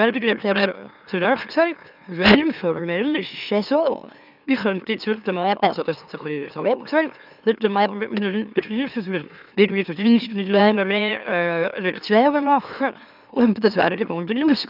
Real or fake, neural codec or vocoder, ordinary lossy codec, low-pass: fake; autoencoder, 44.1 kHz, a latent of 192 numbers a frame, MeloTTS; none; 5.4 kHz